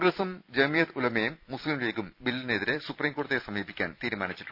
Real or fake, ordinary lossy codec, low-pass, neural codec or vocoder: fake; none; 5.4 kHz; vocoder, 44.1 kHz, 128 mel bands every 256 samples, BigVGAN v2